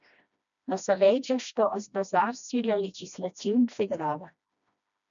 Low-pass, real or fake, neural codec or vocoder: 7.2 kHz; fake; codec, 16 kHz, 1 kbps, FreqCodec, smaller model